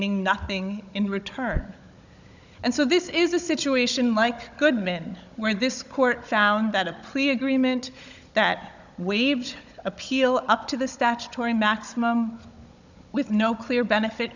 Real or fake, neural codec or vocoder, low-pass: fake; codec, 16 kHz, 16 kbps, FunCodec, trained on Chinese and English, 50 frames a second; 7.2 kHz